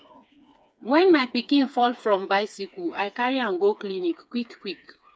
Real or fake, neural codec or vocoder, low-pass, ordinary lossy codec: fake; codec, 16 kHz, 4 kbps, FreqCodec, smaller model; none; none